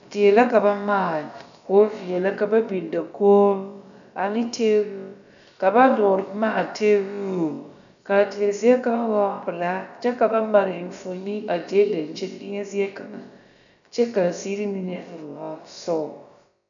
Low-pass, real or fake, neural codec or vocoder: 7.2 kHz; fake; codec, 16 kHz, about 1 kbps, DyCAST, with the encoder's durations